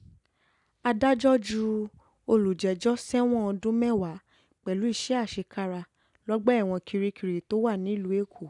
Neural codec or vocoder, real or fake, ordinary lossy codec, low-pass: none; real; none; 10.8 kHz